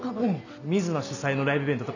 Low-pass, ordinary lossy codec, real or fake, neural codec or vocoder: 7.2 kHz; none; real; none